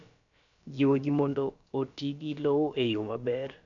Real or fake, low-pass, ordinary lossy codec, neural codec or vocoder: fake; 7.2 kHz; none; codec, 16 kHz, about 1 kbps, DyCAST, with the encoder's durations